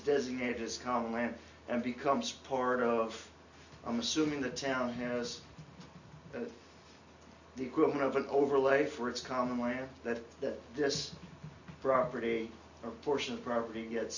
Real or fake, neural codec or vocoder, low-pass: real; none; 7.2 kHz